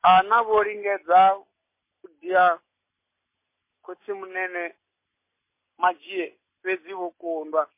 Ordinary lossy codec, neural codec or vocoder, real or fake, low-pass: MP3, 24 kbps; none; real; 3.6 kHz